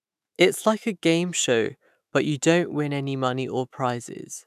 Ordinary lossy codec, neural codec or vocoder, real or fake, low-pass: none; autoencoder, 48 kHz, 128 numbers a frame, DAC-VAE, trained on Japanese speech; fake; 14.4 kHz